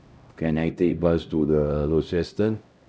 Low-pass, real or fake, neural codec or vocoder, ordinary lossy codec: none; fake; codec, 16 kHz, 0.5 kbps, X-Codec, HuBERT features, trained on LibriSpeech; none